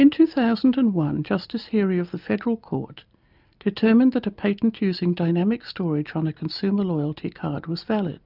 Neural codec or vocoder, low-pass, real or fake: none; 5.4 kHz; real